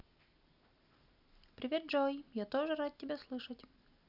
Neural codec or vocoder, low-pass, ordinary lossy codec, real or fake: none; 5.4 kHz; none; real